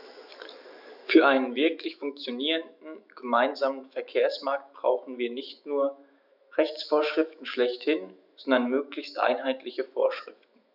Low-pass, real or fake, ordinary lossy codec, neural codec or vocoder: 5.4 kHz; fake; none; autoencoder, 48 kHz, 128 numbers a frame, DAC-VAE, trained on Japanese speech